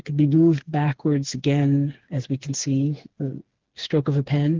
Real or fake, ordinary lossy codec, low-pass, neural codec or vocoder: fake; Opus, 16 kbps; 7.2 kHz; codec, 16 kHz, 4 kbps, FreqCodec, smaller model